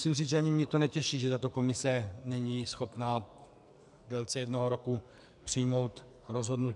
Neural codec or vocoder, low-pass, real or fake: codec, 44.1 kHz, 2.6 kbps, SNAC; 10.8 kHz; fake